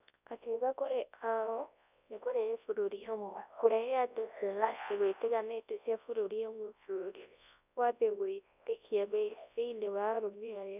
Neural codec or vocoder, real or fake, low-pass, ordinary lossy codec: codec, 24 kHz, 0.9 kbps, WavTokenizer, large speech release; fake; 3.6 kHz; none